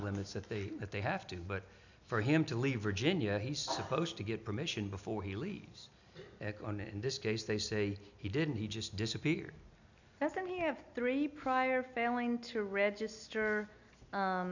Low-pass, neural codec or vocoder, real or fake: 7.2 kHz; none; real